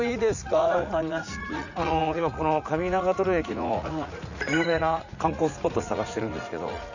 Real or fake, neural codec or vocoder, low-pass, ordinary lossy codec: fake; vocoder, 44.1 kHz, 80 mel bands, Vocos; 7.2 kHz; none